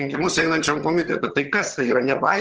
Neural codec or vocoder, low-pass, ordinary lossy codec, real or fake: vocoder, 22.05 kHz, 80 mel bands, HiFi-GAN; 7.2 kHz; Opus, 16 kbps; fake